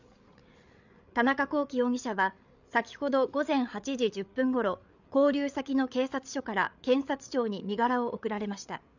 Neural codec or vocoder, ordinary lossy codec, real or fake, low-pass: codec, 16 kHz, 8 kbps, FreqCodec, larger model; none; fake; 7.2 kHz